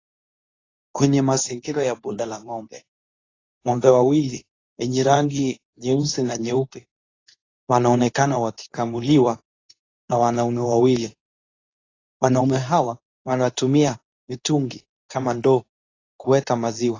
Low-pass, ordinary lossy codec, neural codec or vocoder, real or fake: 7.2 kHz; AAC, 32 kbps; codec, 24 kHz, 0.9 kbps, WavTokenizer, medium speech release version 2; fake